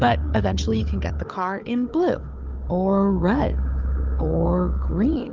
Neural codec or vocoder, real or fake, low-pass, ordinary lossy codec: codec, 16 kHz, 4 kbps, FunCodec, trained on Chinese and English, 50 frames a second; fake; 7.2 kHz; Opus, 32 kbps